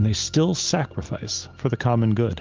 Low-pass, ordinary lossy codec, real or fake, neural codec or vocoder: 7.2 kHz; Opus, 32 kbps; real; none